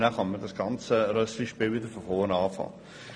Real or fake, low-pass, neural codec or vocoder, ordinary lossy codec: real; 9.9 kHz; none; none